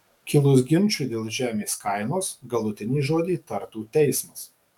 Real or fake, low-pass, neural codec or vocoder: fake; 19.8 kHz; codec, 44.1 kHz, 7.8 kbps, DAC